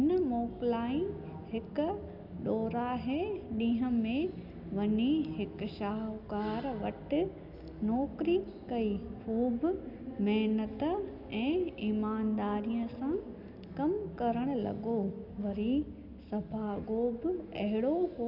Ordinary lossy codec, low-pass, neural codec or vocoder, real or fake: none; 5.4 kHz; none; real